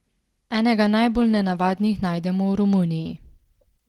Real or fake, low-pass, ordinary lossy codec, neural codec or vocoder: real; 19.8 kHz; Opus, 16 kbps; none